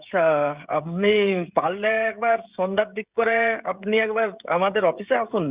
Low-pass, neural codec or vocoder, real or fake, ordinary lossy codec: 3.6 kHz; codec, 16 kHz, 16 kbps, FreqCodec, smaller model; fake; Opus, 64 kbps